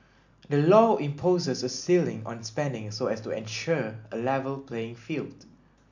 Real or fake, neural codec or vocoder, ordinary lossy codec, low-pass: real; none; none; 7.2 kHz